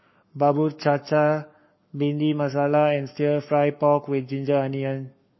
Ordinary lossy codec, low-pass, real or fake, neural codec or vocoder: MP3, 24 kbps; 7.2 kHz; fake; codec, 44.1 kHz, 7.8 kbps, Pupu-Codec